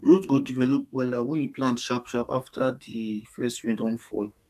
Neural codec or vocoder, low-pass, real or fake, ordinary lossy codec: codec, 32 kHz, 1.9 kbps, SNAC; 14.4 kHz; fake; none